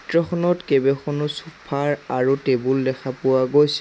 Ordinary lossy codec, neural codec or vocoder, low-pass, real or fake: none; none; none; real